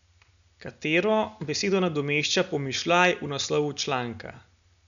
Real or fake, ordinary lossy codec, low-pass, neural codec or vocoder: real; none; 7.2 kHz; none